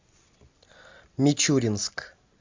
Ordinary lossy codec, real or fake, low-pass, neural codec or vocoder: MP3, 64 kbps; real; 7.2 kHz; none